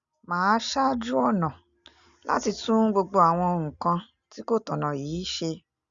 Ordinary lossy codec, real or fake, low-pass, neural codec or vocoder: Opus, 64 kbps; real; 7.2 kHz; none